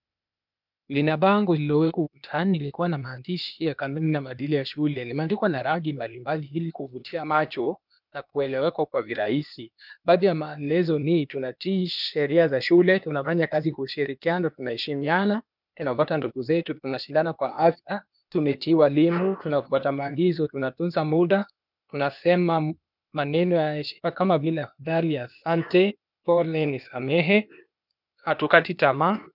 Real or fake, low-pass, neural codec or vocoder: fake; 5.4 kHz; codec, 16 kHz, 0.8 kbps, ZipCodec